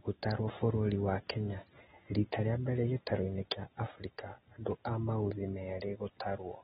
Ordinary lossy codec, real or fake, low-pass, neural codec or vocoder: AAC, 16 kbps; real; 19.8 kHz; none